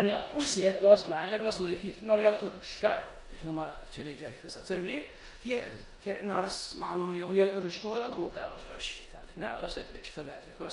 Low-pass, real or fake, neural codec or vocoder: 10.8 kHz; fake; codec, 16 kHz in and 24 kHz out, 0.9 kbps, LongCat-Audio-Codec, four codebook decoder